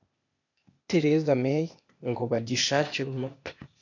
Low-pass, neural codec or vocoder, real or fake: 7.2 kHz; codec, 16 kHz, 0.8 kbps, ZipCodec; fake